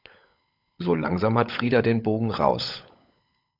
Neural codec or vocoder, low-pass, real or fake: codec, 16 kHz, 16 kbps, FunCodec, trained on LibriTTS, 50 frames a second; 5.4 kHz; fake